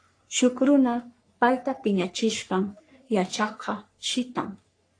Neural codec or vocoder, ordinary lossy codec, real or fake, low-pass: codec, 44.1 kHz, 3.4 kbps, Pupu-Codec; AAC, 48 kbps; fake; 9.9 kHz